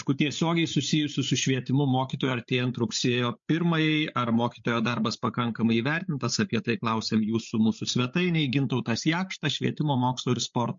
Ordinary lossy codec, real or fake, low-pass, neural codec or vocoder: MP3, 48 kbps; fake; 7.2 kHz; codec, 16 kHz, 8 kbps, FreqCodec, larger model